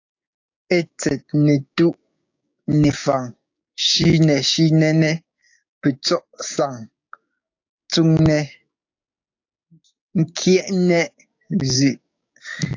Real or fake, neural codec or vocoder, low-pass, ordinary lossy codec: fake; codec, 16 kHz, 6 kbps, DAC; 7.2 kHz; AAC, 48 kbps